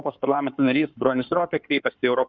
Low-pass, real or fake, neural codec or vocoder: 7.2 kHz; fake; codec, 16 kHz in and 24 kHz out, 2.2 kbps, FireRedTTS-2 codec